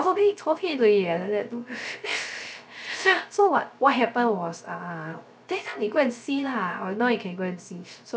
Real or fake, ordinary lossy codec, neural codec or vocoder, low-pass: fake; none; codec, 16 kHz, 0.3 kbps, FocalCodec; none